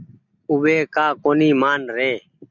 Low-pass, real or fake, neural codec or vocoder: 7.2 kHz; real; none